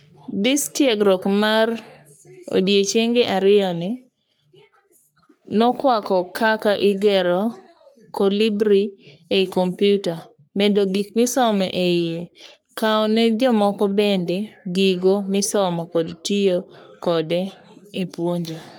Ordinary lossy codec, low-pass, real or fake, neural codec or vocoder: none; none; fake; codec, 44.1 kHz, 3.4 kbps, Pupu-Codec